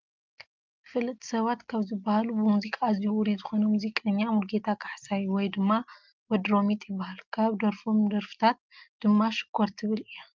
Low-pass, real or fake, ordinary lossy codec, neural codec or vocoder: 7.2 kHz; real; Opus, 24 kbps; none